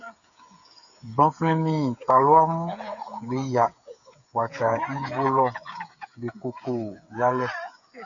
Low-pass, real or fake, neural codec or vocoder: 7.2 kHz; fake; codec, 16 kHz, 8 kbps, FreqCodec, smaller model